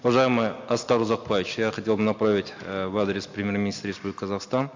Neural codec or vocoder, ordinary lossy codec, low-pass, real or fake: none; MP3, 48 kbps; 7.2 kHz; real